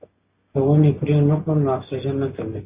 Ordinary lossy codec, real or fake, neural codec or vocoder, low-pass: Opus, 64 kbps; real; none; 3.6 kHz